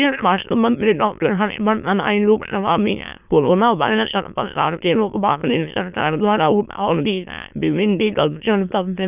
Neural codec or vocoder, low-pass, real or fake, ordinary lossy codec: autoencoder, 22.05 kHz, a latent of 192 numbers a frame, VITS, trained on many speakers; 3.6 kHz; fake; none